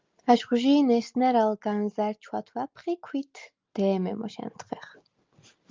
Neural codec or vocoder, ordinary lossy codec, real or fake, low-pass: none; Opus, 24 kbps; real; 7.2 kHz